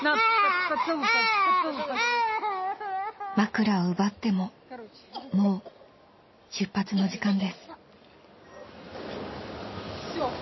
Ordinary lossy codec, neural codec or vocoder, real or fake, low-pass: MP3, 24 kbps; none; real; 7.2 kHz